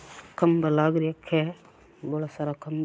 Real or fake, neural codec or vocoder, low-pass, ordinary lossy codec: real; none; none; none